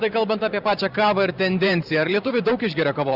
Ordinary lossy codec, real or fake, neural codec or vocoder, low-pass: Opus, 64 kbps; fake; vocoder, 44.1 kHz, 128 mel bands every 512 samples, BigVGAN v2; 5.4 kHz